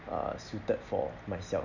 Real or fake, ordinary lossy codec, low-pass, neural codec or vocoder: real; none; 7.2 kHz; none